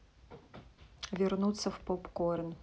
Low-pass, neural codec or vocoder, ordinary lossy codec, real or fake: none; none; none; real